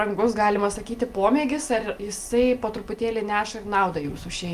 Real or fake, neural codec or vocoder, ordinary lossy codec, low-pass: real; none; Opus, 32 kbps; 14.4 kHz